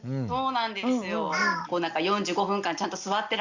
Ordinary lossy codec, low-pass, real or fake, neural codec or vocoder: Opus, 64 kbps; 7.2 kHz; real; none